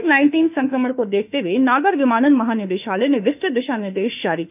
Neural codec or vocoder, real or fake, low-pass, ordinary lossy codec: autoencoder, 48 kHz, 32 numbers a frame, DAC-VAE, trained on Japanese speech; fake; 3.6 kHz; none